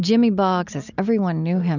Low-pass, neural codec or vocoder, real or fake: 7.2 kHz; none; real